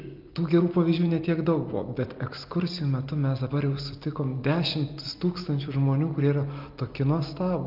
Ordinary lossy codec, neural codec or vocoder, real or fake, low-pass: Opus, 24 kbps; none; real; 5.4 kHz